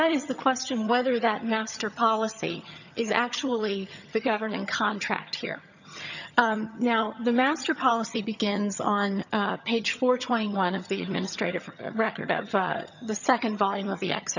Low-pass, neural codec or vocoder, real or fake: 7.2 kHz; vocoder, 22.05 kHz, 80 mel bands, HiFi-GAN; fake